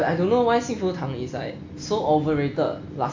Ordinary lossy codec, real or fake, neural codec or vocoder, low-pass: AAC, 48 kbps; real; none; 7.2 kHz